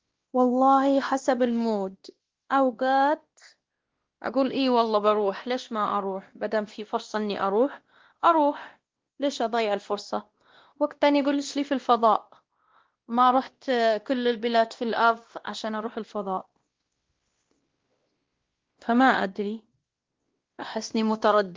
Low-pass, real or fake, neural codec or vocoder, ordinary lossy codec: 7.2 kHz; fake; codec, 16 kHz, 1 kbps, X-Codec, WavLM features, trained on Multilingual LibriSpeech; Opus, 16 kbps